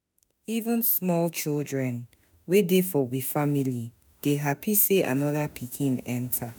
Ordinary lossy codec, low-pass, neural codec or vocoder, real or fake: none; none; autoencoder, 48 kHz, 32 numbers a frame, DAC-VAE, trained on Japanese speech; fake